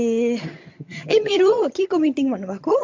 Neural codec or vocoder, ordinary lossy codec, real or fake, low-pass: vocoder, 22.05 kHz, 80 mel bands, HiFi-GAN; MP3, 48 kbps; fake; 7.2 kHz